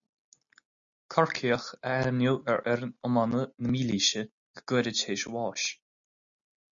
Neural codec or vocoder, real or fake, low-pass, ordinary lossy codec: none; real; 7.2 kHz; MP3, 64 kbps